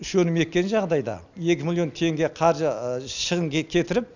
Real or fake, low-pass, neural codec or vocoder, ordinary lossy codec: real; 7.2 kHz; none; none